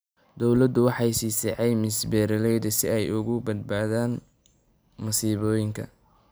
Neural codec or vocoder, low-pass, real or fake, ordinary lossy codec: none; none; real; none